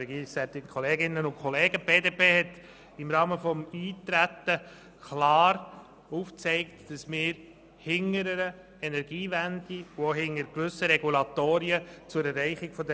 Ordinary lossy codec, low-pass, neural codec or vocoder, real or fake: none; none; none; real